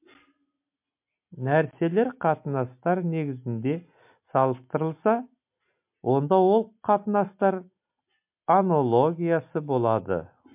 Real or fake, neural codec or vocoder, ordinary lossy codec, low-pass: real; none; MP3, 32 kbps; 3.6 kHz